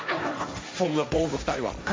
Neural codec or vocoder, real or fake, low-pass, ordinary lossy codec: codec, 16 kHz, 1.1 kbps, Voila-Tokenizer; fake; none; none